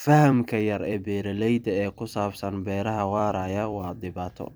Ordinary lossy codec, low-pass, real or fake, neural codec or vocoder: none; none; fake; vocoder, 44.1 kHz, 128 mel bands every 256 samples, BigVGAN v2